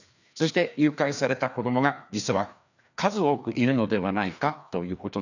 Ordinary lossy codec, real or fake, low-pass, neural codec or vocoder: none; fake; 7.2 kHz; codec, 16 kHz, 2 kbps, FreqCodec, larger model